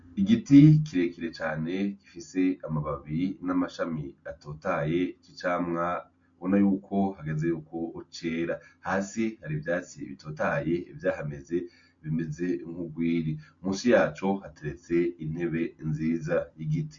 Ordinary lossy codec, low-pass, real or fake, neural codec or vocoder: MP3, 48 kbps; 7.2 kHz; real; none